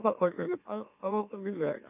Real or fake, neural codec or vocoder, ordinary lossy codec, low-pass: fake; autoencoder, 44.1 kHz, a latent of 192 numbers a frame, MeloTTS; none; 3.6 kHz